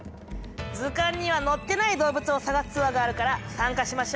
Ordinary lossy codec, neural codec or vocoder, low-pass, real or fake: none; none; none; real